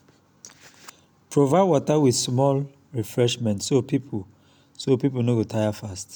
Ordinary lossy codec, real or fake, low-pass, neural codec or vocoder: none; real; none; none